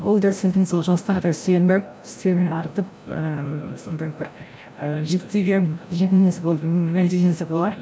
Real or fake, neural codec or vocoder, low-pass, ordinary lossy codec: fake; codec, 16 kHz, 0.5 kbps, FreqCodec, larger model; none; none